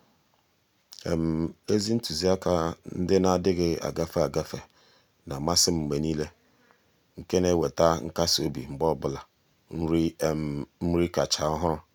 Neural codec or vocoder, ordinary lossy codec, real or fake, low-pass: none; none; real; 19.8 kHz